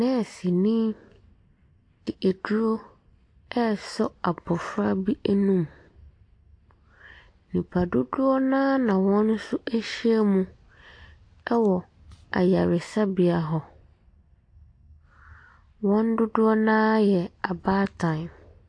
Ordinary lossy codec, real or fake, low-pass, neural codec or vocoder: AAC, 48 kbps; real; 9.9 kHz; none